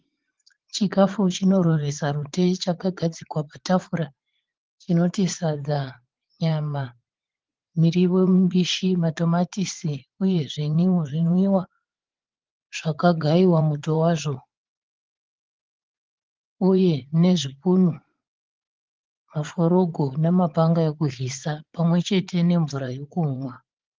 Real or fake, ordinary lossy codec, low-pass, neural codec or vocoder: fake; Opus, 16 kbps; 7.2 kHz; vocoder, 44.1 kHz, 80 mel bands, Vocos